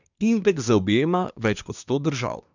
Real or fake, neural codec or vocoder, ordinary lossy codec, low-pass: fake; codec, 24 kHz, 1 kbps, SNAC; none; 7.2 kHz